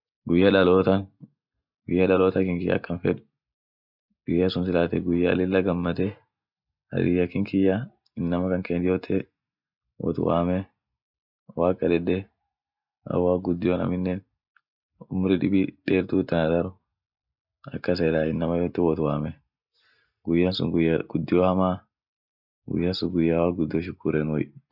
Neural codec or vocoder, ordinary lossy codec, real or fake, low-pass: none; none; real; 5.4 kHz